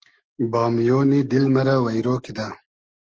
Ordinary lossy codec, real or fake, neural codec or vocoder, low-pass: Opus, 16 kbps; fake; autoencoder, 48 kHz, 128 numbers a frame, DAC-VAE, trained on Japanese speech; 7.2 kHz